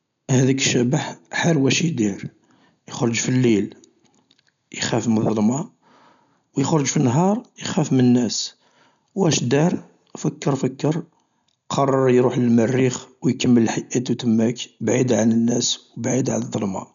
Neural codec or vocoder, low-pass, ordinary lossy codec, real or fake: none; 7.2 kHz; none; real